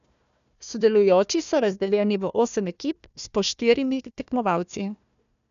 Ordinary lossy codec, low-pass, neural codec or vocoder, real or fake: none; 7.2 kHz; codec, 16 kHz, 1 kbps, FunCodec, trained on Chinese and English, 50 frames a second; fake